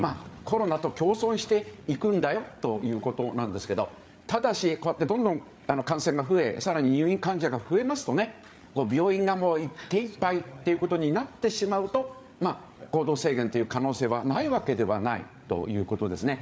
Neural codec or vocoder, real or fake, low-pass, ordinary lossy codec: codec, 16 kHz, 8 kbps, FreqCodec, larger model; fake; none; none